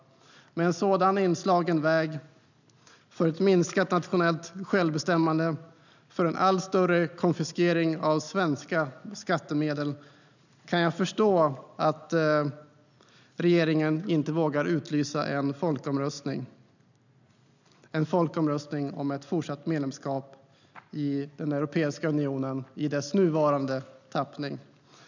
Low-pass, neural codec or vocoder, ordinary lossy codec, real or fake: 7.2 kHz; none; none; real